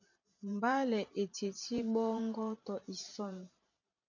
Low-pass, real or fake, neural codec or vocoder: 7.2 kHz; fake; vocoder, 44.1 kHz, 128 mel bands every 512 samples, BigVGAN v2